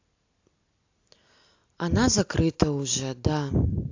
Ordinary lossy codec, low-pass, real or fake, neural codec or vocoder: AAC, 48 kbps; 7.2 kHz; real; none